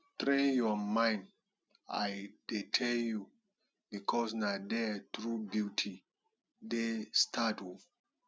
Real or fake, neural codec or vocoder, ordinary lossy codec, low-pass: real; none; none; none